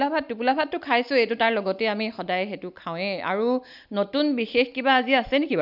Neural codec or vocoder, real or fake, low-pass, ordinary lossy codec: none; real; 5.4 kHz; none